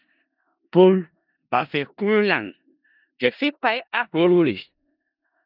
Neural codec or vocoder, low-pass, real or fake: codec, 16 kHz in and 24 kHz out, 0.4 kbps, LongCat-Audio-Codec, four codebook decoder; 5.4 kHz; fake